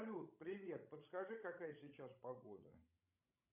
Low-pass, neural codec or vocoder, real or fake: 3.6 kHz; codec, 16 kHz, 16 kbps, FreqCodec, larger model; fake